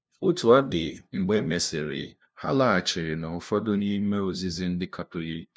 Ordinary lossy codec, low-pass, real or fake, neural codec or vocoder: none; none; fake; codec, 16 kHz, 0.5 kbps, FunCodec, trained on LibriTTS, 25 frames a second